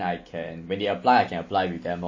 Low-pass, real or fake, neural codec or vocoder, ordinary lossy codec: 7.2 kHz; real; none; MP3, 32 kbps